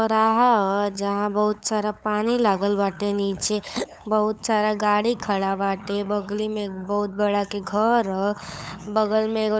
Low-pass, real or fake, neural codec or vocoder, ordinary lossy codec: none; fake; codec, 16 kHz, 8 kbps, FunCodec, trained on LibriTTS, 25 frames a second; none